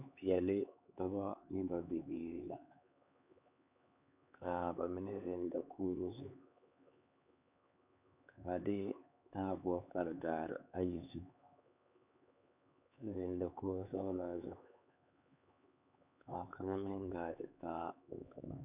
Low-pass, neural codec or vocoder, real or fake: 3.6 kHz; codec, 16 kHz, 4 kbps, X-Codec, HuBERT features, trained on LibriSpeech; fake